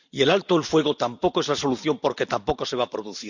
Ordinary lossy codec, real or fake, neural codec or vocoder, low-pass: none; real; none; 7.2 kHz